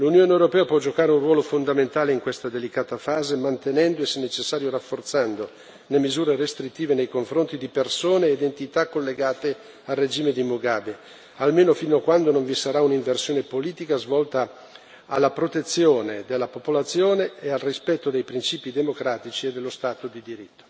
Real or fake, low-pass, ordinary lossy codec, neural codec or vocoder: real; none; none; none